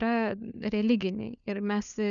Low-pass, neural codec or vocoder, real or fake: 7.2 kHz; codec, 16 kHz, 4 kbps, FunCodec, trained on LibriTTS, 50 frames a second; fake